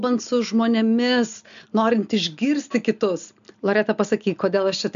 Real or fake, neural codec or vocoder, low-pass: real; none; 7.2 kHz